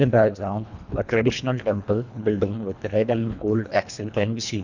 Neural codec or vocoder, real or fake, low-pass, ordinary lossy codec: codec, 24 kHz, 1.5 kbps, HILCodec; fake; 7.2 kHz; none